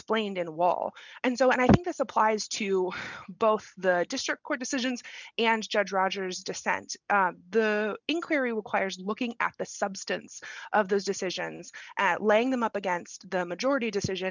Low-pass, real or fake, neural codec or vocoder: 7.2 kHz; real; none